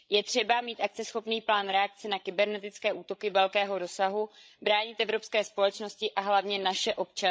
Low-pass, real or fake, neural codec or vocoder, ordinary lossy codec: none; fake; codec, 16 kHz, 16 kbps, FreqCodec, larger model; none